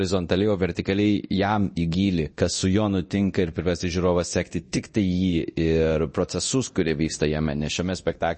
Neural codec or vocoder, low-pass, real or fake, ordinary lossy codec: codec, 24 kHz, 0.9 kbps, DualCodec; 10.8 kHz; fake; MP3, 32 kbps